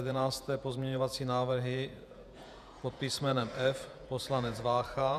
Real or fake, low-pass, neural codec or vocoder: fake; 14.4 kHz; vocoder, 48 kHz, 128 mel bands, Vocos